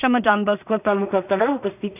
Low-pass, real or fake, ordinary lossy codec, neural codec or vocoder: 3.6 kHz; fake; none; codec, 16 kHz in and 24 kHz out, 0.4 kbps, LongCat-Audio-Codec, two codebook decoder